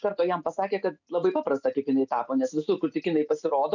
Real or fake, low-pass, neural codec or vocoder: real; 7.2 kHz; none